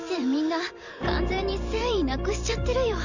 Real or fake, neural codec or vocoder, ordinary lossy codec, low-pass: fake; vocoder, 44.1 kHz, 128 mel bands every 512 samples, BigVGAN v2; none; 7.2 kHz